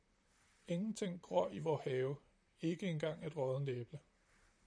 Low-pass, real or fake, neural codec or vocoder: 9.9 kHz; fake; vocoder, 44.1 kHz, 128 mel bands, Pupu-Vocoder